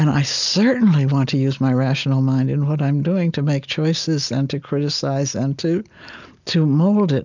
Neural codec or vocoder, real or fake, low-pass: none; real; 7.2 kHz